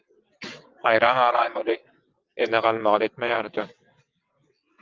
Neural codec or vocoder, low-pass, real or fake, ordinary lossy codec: vocoder, 22.05 kHz, 80 mel bands, WaveNeXt; 7.2 kHz; fake; Opus, 24 kbps